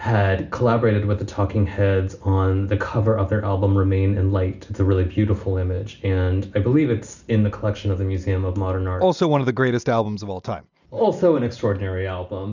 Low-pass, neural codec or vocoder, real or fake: 7.2 kHz; none; real